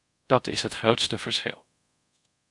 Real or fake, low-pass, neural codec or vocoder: fake; 10.8 kHz; codec, 24 kHz, 0.5 kbps, DualCodec